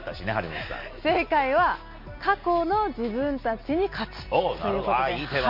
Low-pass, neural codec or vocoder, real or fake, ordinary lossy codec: 5.4 kHz; none; real; MP3, 32 kbps